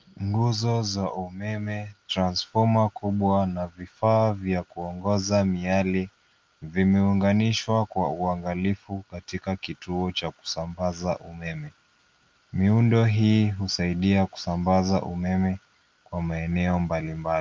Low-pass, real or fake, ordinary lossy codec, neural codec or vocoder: 7.2 kHz; real; Opus, 24 kbps; none